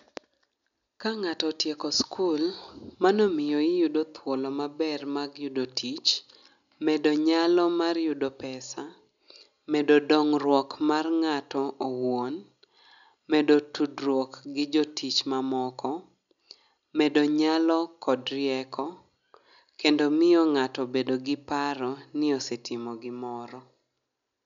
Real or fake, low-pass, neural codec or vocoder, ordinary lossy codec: real; 7.2 kHz; none; none